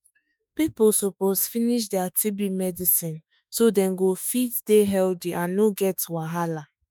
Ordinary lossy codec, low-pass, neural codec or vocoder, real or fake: none; none; autoencoder, 48 kHz, 32 numbers a frame, DAC-VAE, trained on Japanese speech; fake